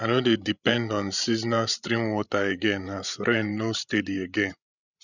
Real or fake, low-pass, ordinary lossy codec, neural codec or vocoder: fake; 7.2 kHz; none; codec, 16 kHz, 16 kbps, FreqCodec, larger model